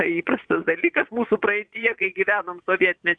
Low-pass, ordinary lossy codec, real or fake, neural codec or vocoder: 9.9 kHz; MP3, 64 kbps; fake; vocoder, 22.05 kHz, 80 mel bands, Vocos